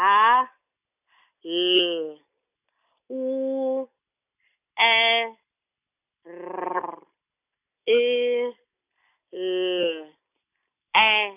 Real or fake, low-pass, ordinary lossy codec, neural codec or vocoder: real; 3.6 kHz; none; none